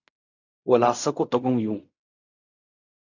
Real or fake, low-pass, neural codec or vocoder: fake; 7.2 kHz; codec, 16 kHz in and 24 kHz out, 0.4 kbps, LongCat-Audio-Codec, fine tuned four codebook decoder